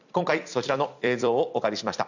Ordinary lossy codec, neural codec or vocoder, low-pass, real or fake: none; vocoder, 44.1 kHz, 128 mel bands every 256 samples, BigVGAN v2; 7.2 kHz; fake